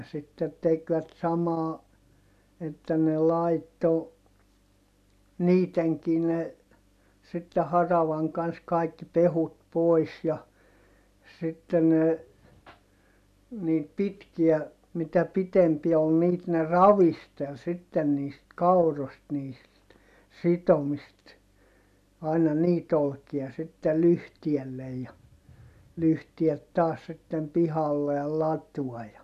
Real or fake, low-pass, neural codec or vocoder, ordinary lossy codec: real; 14.4 kHz; none; none